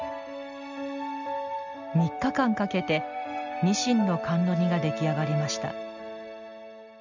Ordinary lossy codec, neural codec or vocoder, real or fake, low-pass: none; none; real; 7.2 kHz